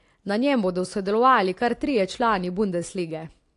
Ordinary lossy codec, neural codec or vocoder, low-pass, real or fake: AAC, 48 kbps; none; 10.8 kHz; real